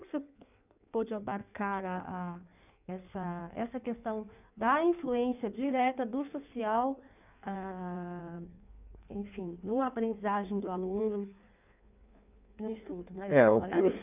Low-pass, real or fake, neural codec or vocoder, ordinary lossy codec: 3.6 kHz; fake; codec, 16 kHz in and 24 kHz out, 1.1 kbps, FireRedTTS-2 codec; none